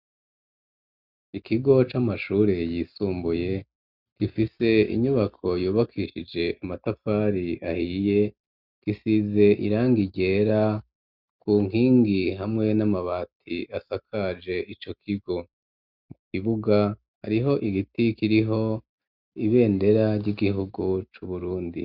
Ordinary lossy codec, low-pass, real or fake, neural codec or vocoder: Opus, 64 kbps; 5.4 kHz; real; none